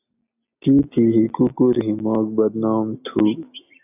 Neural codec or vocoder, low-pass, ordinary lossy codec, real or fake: none; 3.6 kHz; AAC, 32 kbps; real